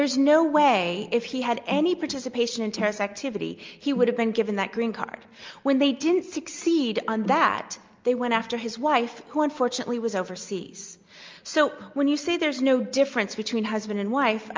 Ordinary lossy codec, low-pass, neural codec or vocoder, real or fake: Opus, 24 kbps; 7.2 kHz; none; real